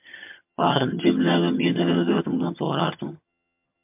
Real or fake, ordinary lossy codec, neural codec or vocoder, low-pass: fake; MP3, 32 kbps; vocoder, 22.05 kHz, 80 mel bands, HiFi-GAN; 3.6 kHz